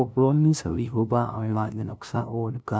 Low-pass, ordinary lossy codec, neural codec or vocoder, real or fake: none; none; codec, 16 kHz, 0.5 kbps, FunCodec, trained on LibriTTS, 25 frames a second; fake